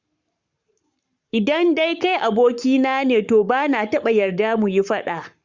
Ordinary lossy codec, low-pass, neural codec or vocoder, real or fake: Opus, 64 kbps; 7.2 kHz; codec, 44.1 kHz, 7.8 kbps, Pupu-Codec; fake